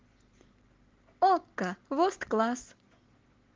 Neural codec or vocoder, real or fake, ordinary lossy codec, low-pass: none; real; Opus, 16 kbps; 7.2 kHz